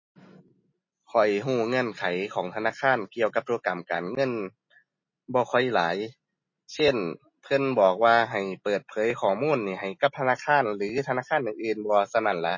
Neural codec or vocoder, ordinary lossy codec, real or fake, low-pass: none; MP3, 32 kbps; real; 7.2 kHz